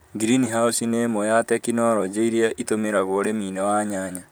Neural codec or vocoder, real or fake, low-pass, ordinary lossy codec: vocoder, 44.1 kHz, 128 mel bands, Pupu-Vocoder; fake; none; none